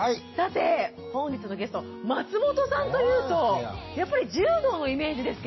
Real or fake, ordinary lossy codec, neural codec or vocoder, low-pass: real; MP3, 24 kbps; none; 7.2 kHz